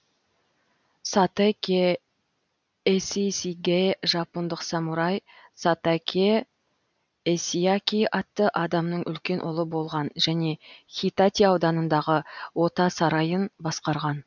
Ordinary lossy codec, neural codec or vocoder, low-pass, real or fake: none; none; 7.2 kHz; real